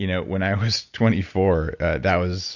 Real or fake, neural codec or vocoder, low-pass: real; none; 7.2 kHz